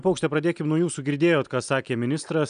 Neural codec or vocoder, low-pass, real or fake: none; 9.9 kHz; real